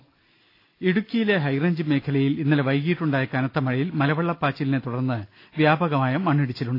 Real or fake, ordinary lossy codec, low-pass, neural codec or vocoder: real; AAC, 32 kbps; 5.4 kHz; none